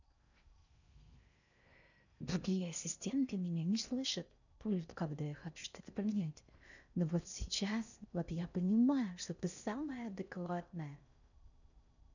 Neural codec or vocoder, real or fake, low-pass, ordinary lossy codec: codec, 16 kHz in and 24 kHz out, 0.6 kbps, FocalCodec, streaming, 4096 codes; fake; 7.2 kHz; none